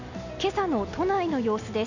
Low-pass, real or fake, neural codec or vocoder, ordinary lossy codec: 7.2 kHz; real; none; none